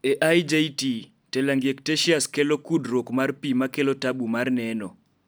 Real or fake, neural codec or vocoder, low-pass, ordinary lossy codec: real; none; none; none